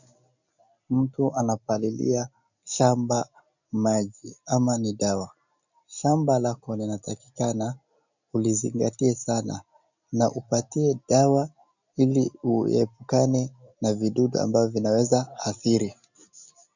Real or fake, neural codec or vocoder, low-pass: real; none; 7.2 kHz